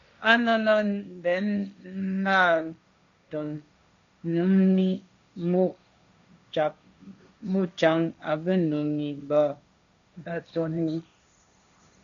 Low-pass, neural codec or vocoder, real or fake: 7.2 kHz; codec, 16 kHz, 1.1 kbps, Voila-Tokenizer; fake